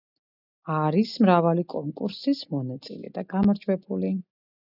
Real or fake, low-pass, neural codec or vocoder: real; 5.4 kHz; none